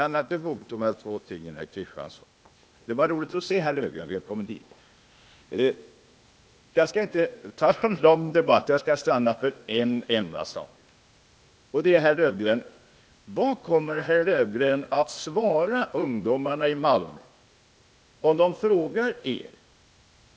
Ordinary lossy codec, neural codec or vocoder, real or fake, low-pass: none; codec, 16 kHz, 0.8 kbps, ZipCodec; fake; none